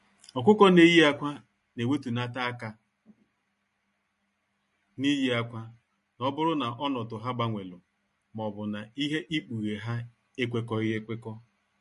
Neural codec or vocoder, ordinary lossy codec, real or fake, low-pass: none; MP3, 48 kbps; real; 14.4 kHz